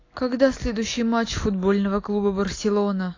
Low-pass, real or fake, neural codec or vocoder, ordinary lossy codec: 7.2 kHz; real; none; AAC, 32 kbps